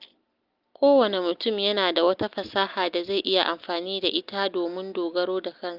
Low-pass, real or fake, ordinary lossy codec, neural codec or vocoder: 5.4 kHz; real; Opus, 24 kbps; none